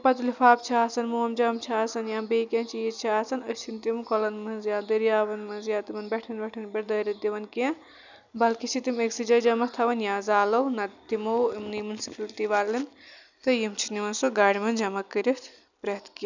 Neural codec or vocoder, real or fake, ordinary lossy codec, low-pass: none; real; none; 7.2 kHz